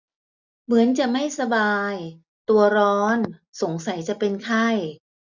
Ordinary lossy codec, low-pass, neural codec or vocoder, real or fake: none; 7.2 kHz; none; real